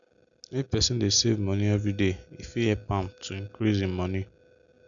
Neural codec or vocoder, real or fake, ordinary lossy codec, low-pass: none; real; none; 7.2 kHz